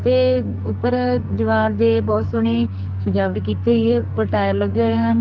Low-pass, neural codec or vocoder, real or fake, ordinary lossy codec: 7.2 kHz; codec, 44.1 kHz, 2.6 kbps, SNAC; fake; Opus, 32 kbps